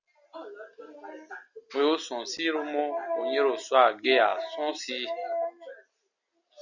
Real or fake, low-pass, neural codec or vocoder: real; 7.2 kHz; none